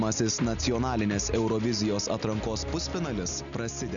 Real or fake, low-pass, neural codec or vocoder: real; 7.2 kHz; none